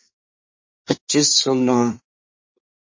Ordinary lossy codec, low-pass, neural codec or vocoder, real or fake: MP3, 32 kbps; 7.2 kHz; codec, 24 kHz, 1 kbps, SNAC; fake